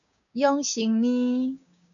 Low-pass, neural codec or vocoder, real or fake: 7.2 kHz; codec, 16 kHz, 6 kbps, DAC; fake